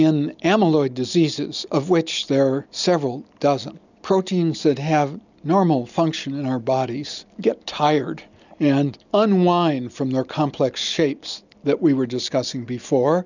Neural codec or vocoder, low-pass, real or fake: none; 7.2 kHz; real